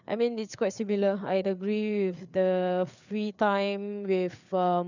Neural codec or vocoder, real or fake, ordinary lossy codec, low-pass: codec, 16 kHz, 4 kbps, FreqCodec, larger model; fake; none; 7.2 kHz